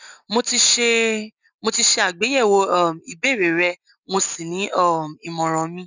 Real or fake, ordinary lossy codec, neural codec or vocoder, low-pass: real; none; none; 7.2 kHz